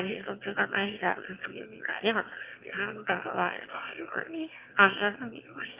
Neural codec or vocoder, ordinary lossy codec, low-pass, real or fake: autoencoder, 22.05 kHz, a latent of 192 numbers a frame, VITS, trained on one speaker; Opus, 24 kbps; 3.6 kHz; fake